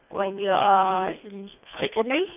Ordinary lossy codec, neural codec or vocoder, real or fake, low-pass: none; codec, 24 kHz, 1.5 kbps, HILCodec; fake; 3.6 kHz